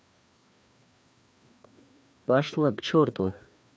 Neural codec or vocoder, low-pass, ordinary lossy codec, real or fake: codec, 16 kHz, 2 kbps, FreqCodec, larger model; none; none; fake